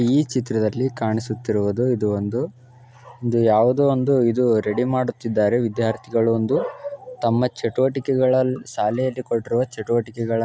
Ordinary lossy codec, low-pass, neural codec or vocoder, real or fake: none; none; none; real